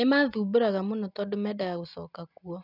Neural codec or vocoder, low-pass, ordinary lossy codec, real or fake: none; 5.4 kHz; none; real